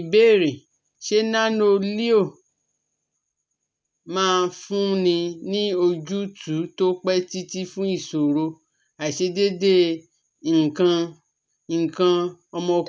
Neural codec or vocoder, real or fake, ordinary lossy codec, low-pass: none; real; none; none